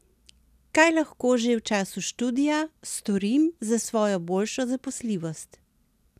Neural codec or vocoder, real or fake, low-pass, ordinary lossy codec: none; real; 14.4 kHz; none